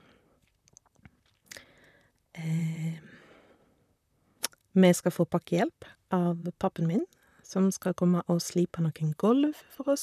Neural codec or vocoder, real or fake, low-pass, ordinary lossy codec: vocoder, 44.1 kHz, 128 mel bands, Pupu-Vocoder; fake; 14.4 kHz; none